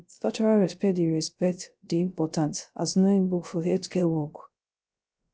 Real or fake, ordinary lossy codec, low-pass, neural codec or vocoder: fake; none; none; codec, 16 kHz, 0.3 kbps, FocalCodec